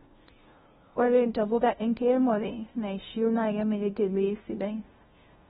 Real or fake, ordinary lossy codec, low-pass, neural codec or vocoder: fake; AAC, 16 kbps; 7.2 kHz; codec, 16 kHz, 0.5 kbps, FunCodec, trained on LibriTTS, 25 frames a second